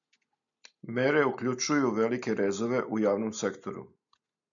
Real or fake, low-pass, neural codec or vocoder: real; 7.2 kHz; none